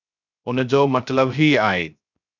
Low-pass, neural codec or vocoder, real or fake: 7.2 kHz; codec, 16 kHz, 0.3 kbps, FocalCodec; fake